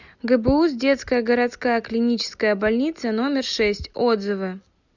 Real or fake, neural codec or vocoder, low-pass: real; none; 7.2 kHz